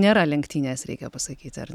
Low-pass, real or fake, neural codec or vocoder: 19.8 kHz; real; none